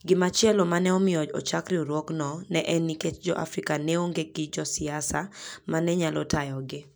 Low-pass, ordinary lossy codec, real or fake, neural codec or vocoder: none; none; real; none